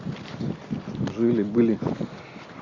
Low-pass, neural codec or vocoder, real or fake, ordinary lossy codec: 7.2 kHz; none; real; MP3, 64 kbps